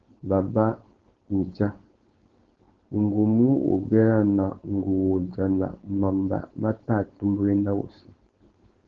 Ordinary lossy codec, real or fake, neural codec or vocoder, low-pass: Opus, 16 kbps; fake; codec, 16 kHz, 4.8 kbps, FACodec; 7.2 kHz